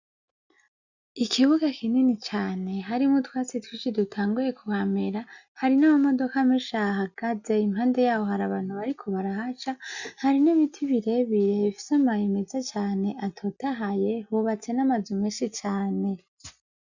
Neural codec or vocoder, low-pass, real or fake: none; 7.2 kHz; real